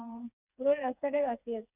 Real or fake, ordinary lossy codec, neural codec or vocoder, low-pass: fake; Opus, 64 kbps; codec, 16 kHz, 4 kbps, FreqCodec, smaller model; 3.6 kHz